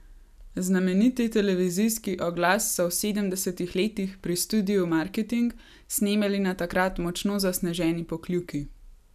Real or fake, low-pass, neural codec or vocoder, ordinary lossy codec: real; 14.4 kHz; none; none